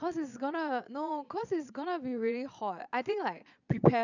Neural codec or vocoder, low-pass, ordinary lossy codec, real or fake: vocoder, 22.05 kHz, 80 mel bands, Vocos; 7.2 kHz; none; fake